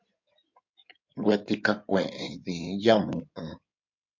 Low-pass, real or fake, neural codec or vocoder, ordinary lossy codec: 7.2 kHz; fake; codec, 16 kHz in and 24 kHz out, 2.2 kbps, FireRedTTS-2 codec; MP3, 48 kbps